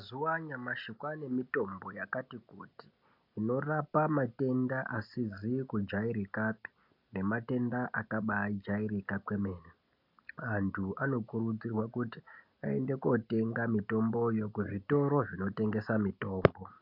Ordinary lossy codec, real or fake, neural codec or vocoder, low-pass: MP3, 48 kbps; real; none; 5.4 kHz